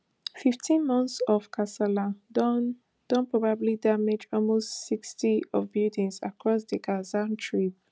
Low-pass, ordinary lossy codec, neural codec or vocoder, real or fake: none; none; none; real